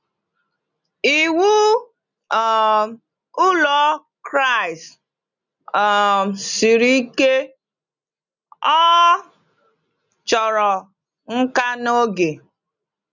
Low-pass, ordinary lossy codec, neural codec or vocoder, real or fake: 7.2 kHz; none; none; real